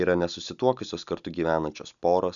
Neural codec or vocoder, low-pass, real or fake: none; 7.2 kHz; real